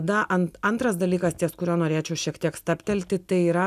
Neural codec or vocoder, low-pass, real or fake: none; 14.4 kHz; real